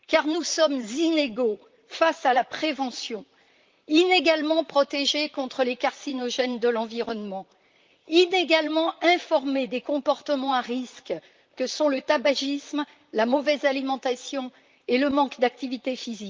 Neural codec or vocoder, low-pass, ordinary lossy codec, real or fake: vocoder, 44.1 kHz, 128 mel bands, Pupu-Vocoder; 7.2 kHz; Opus, 32 kbps; fake